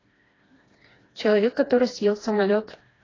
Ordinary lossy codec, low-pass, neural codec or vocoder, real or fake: AAC, 32 kbps; 7.2 kHz; codec, 16 kHz, 2 kbps, FreqCodec, smaller model; fake